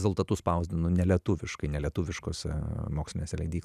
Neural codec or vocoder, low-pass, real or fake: none; 14.4 kHz; real